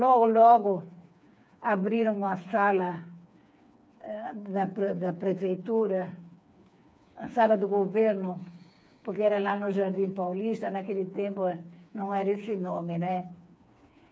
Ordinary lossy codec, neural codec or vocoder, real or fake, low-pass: none; codec, 16 kHz, 4 kbps, FreqCodec, smaller model; fake; none